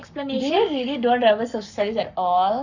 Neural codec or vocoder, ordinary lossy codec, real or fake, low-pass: codec, 44.1 kHz, 7.8 kbps, Pupu-Codec; none; fake; 7.2 kHz